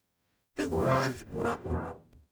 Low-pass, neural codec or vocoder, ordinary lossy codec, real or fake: none; codec, 44.1 kHz, 0.9 kbps, DAC; none; fake